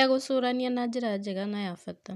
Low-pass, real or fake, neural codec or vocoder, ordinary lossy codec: 14.4 kHz; real; none; none